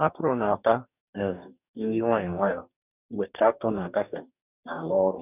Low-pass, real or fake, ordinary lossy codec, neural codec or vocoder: 3.6 kHz; fake; none; codec, 44.1 kHz, 2.6 kbps, DAC